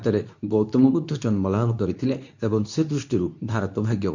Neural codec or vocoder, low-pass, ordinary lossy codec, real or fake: codec, 24 kHz, 0.9 kbps, WavTokenizer, medium speech release version 2; 7.2 kHz; none; fake